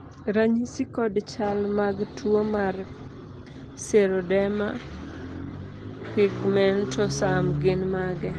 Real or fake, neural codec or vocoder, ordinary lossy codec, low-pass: real; none; Opus, 16 kbps; 9.9 kHz